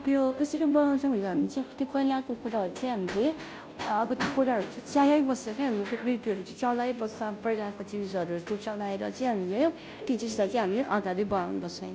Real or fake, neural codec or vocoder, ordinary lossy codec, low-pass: fake; codec, 16 kHz, 0.5 kbps, FunCodec, trained on Chinese and English, 25 frames a second; none; none